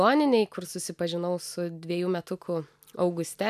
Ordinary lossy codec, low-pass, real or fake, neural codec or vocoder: AAC, 96 kbps; 14.4 kHz; real; none